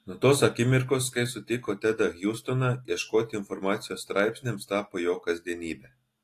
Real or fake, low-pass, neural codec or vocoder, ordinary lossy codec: real; 14.4 kHz; none; AAC, 48 kbps